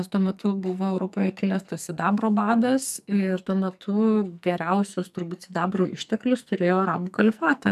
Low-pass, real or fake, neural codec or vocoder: 14.4 kHz; fake; codec, 44.1 kHz, 2.6 kbps, SNAC